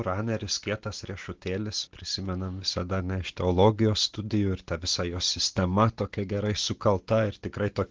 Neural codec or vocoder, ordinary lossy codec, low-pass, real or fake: none; Opus, 16 kbps; 7.2 kHz; real